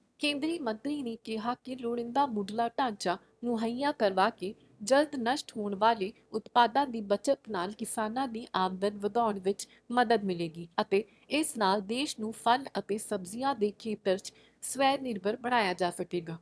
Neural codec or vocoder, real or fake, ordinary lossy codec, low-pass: autoencoder, 22.05 kHz, a latent of 192 numbers a frame, VITS, trained on one speaker; fake; none; none